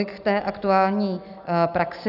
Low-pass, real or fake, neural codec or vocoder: 5.4 kHz; real; none